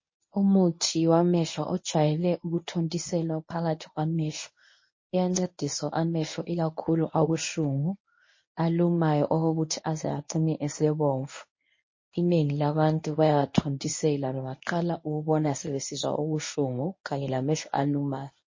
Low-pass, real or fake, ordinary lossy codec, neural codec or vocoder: 7.2 kHz; fake; MP3, 32 kbps; codec, 24 kHz, 0.9 kbps, WavTokenizer, medium speech release version 1